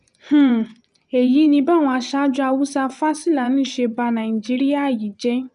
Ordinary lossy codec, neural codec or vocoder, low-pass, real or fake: none; vocoder, 24 kHz, 100 mel bands, Vocos; 10.8 kHz; fake